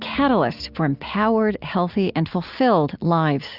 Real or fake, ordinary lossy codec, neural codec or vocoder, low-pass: real; Opus, 64 kbps; none; 5.4 kHz